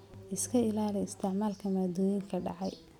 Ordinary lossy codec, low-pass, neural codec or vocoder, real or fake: none; 19.8 kHz; none; real